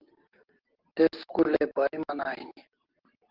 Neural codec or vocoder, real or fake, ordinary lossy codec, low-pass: none; real; Opus, 16 kbps; 5.4 kHz